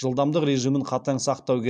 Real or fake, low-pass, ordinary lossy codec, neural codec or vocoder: real; 9.9 kHz; MP3, 96 kbps; none